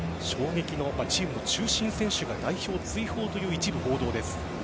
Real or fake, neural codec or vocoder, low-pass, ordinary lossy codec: real; none; none; none